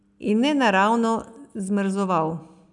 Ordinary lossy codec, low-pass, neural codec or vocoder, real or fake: none; 10.8 kHz; none; real